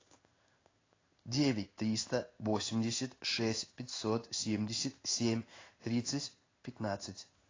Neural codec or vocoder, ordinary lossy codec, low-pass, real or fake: codec, 16 kHz in and 24 kHz out, 1 kbps, XY-Tokenizer; AAC, 32 kbps; 7.2 kHz; fake